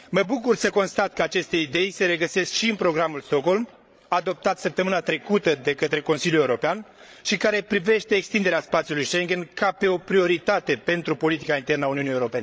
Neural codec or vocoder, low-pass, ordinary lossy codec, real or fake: codec, 16 kHz, 16 kbps, FreqCodec, larger model; none; none; fake